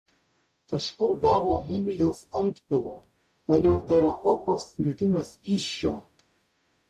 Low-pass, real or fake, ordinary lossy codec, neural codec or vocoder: 14.4 kHz; fake; AAC, 96 kbps; codec, 44.1 kHz, 0.9 kbps, DAC